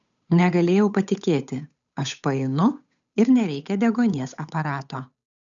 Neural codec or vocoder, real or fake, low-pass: codec, 16 kHz, 8 kbps, FunCodec, trained on Chinese and English, 25 frames a second; fake; 7.2 kHz